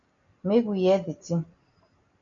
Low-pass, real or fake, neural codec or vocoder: 7.2 kHz; real; none